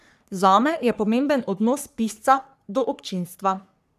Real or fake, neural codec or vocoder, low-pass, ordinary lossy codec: fake; codec, 44.1 kHz, 3.4 kbps, Pupu-Codec; 14.4 kHz; none